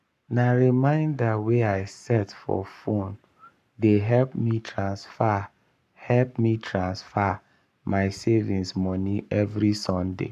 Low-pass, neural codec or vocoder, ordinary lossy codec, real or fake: 14.4 kHz; codec, 44.1 kHz, 7.8 kbps, Pupu-Codec; none; fake